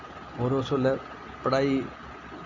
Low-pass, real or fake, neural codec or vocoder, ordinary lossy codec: 7.2 kHz; real; none; none